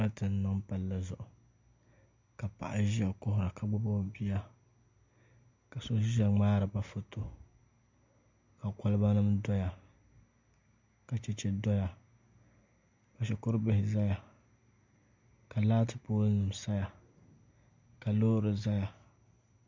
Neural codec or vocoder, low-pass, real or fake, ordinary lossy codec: none; 7.2 kHz; real; MP3, 64 kbps